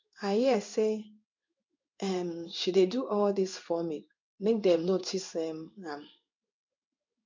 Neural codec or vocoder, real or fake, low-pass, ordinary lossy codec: codec, 16 kHz in and 24 kHz out, 1 kbps, XY-Tokenizer; fake; 7.2 kHz; MP3, 48 kbps